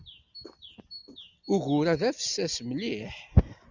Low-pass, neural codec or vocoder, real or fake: 7.2 kHz; none; real